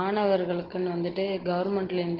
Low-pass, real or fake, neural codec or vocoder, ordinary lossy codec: 5.4 kHz; real; none; Opus, 16 kbps